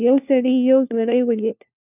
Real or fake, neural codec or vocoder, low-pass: fake; codec, 16 kHz, 1 kbps, FunCodec, trained on LibriTTS, 50 frames a second; 3.6 kHz